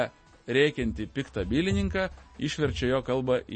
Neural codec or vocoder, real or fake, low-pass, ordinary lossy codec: none; real; 10.8 kHz; MP3, 32 kbps